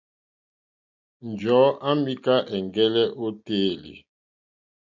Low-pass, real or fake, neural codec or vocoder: 7.2 kHz; real; none